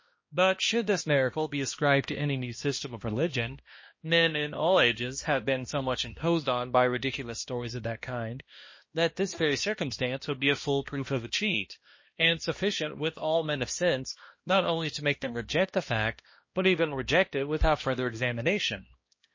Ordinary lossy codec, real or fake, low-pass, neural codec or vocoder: MP3, 32 kbps; fake; 7.2 kHz; codec, 16 kHz, 1 kbps, X-Codec, HuBERT features, trained on balanced general audio